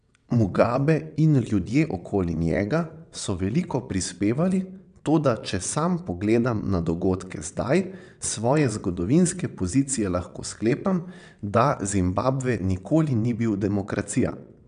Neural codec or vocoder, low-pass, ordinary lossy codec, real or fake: vocoder, 22.05 kHz, 80 mel bands, WaveNeXt; 9.9 kHz; none; fake